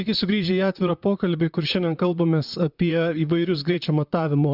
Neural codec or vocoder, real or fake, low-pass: vocoder, 44.1 kHz, 128 mel bands, Pupu-Vocoder; fake; 5.4 kHz